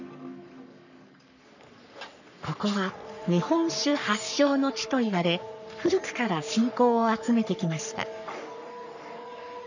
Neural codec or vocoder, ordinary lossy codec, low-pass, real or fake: codec, 44.1 kHz, 3.4 kbps, Pupu-Codec; none; 7.2 kHz; fake